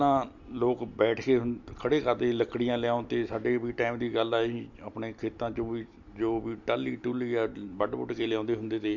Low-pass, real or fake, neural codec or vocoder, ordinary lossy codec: 7.2 kHz; real; none; MP3, 48 kbps